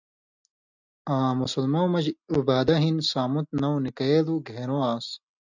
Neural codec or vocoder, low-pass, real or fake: none; 7.2 kHz; real